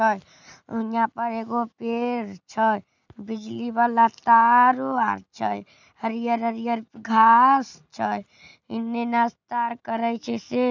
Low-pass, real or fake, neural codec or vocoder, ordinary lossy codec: 7.2 kHz; real; none; none